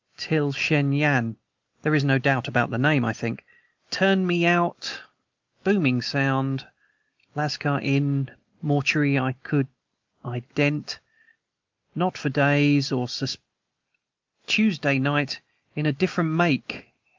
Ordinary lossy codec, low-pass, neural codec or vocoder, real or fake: Opus, 24 kbps; 7.2 kHz; none; real